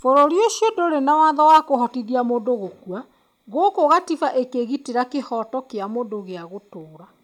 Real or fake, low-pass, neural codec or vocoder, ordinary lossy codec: real; 19.8 kHz; none; none